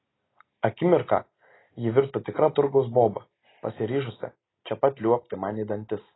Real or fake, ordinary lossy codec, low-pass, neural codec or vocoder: real; AAC, 16 kbps; 7.2 kHz; none